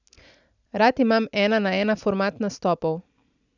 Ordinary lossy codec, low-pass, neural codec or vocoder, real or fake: none; 7.2 kHz; none; real